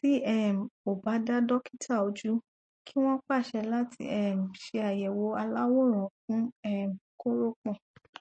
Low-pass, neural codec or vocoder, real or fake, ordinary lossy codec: 9.9 kHz; none; real; MP3, 32 kbps